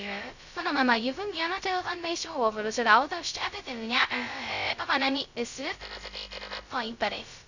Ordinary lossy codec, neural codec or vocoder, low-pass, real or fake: none; codec, 16 kHz, 0.2 kbps, FocalCodec; 7.2 kHz; fake